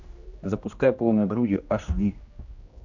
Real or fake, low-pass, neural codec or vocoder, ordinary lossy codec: fake; 7.2 kHz; codec, 16 kHz, 1 kbps, X-Codec, HuBERT features, trained on general audio; AAC, 48 kbps